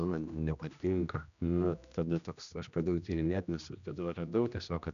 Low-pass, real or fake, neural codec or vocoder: 7.2 kHz; fake; codec, 16 kHz, 1 kbps, X-Codec, HuBERT features, trained on general audio